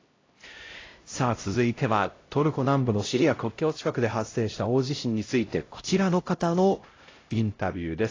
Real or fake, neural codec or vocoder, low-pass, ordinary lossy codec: fake; codec, 16 kHz, 0.5 kbps, X-Codec, HuBERT features, trained on LibriSpeech; 7.2 kHz; AAC, 32 kbps